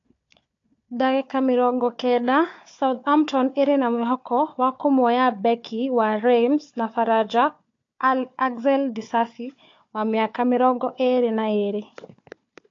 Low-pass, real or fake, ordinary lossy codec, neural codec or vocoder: 7.2 kHz; fake; AAC, 48 kbps; codec, 16 kHz, 4 kbps, FunCodec, trained on Chinese and English, 50 frames a second